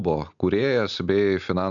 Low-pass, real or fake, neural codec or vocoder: 7.2 kHz; real; none